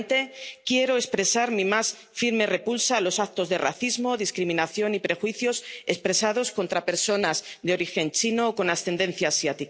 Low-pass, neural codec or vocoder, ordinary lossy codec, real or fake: none; none; none; real